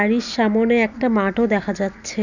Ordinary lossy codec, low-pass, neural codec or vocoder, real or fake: none; 7.2 kHz; none; real